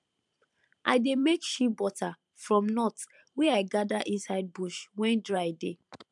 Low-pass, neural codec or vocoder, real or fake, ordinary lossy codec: 10.8 kHz; vocoder, 44.1 kHz, 128 mel bands every 512 samples, BigVGAN v2; fake; MP3, 96 kbps